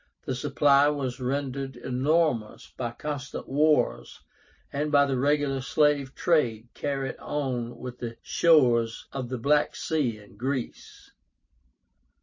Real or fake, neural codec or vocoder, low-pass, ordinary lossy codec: real; none; 7.2 kHz; MP3, 32 kbps